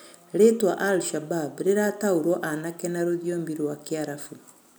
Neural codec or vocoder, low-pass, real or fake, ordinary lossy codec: none; none; real; none